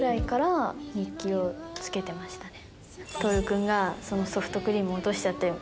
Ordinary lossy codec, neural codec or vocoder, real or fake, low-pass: none; none; real; none